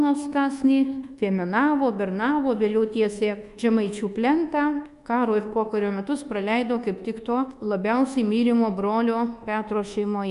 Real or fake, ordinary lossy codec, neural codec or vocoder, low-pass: fake; Opus, 64 kbps; codec, 24 kHz, 1.2 kbps, DualCodec; 10.8 kHz